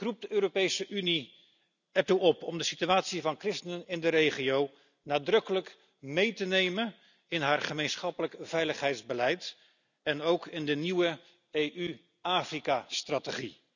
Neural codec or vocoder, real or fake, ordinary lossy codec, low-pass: none; real; none; 7.2 kHz